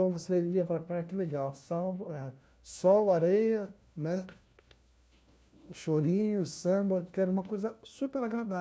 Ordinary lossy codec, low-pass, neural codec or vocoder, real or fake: none; none; codec, 16 kHz, 1 kbps, FunCodec, trained on LibriTTS, 50 frames a second; fake